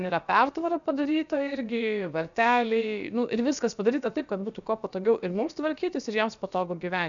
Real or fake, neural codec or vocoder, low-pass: fake; codec, 16 kHz, about 1 kbps, DyCAST, with the encoder's durations; 7.2 kHz